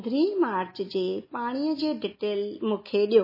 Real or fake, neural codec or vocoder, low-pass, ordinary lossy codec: real; none; 5.4 kHz; MP3, 24 kbps